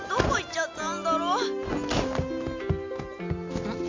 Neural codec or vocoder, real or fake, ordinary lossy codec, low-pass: none; real; AAC, 48 kbps; 7.2 kHz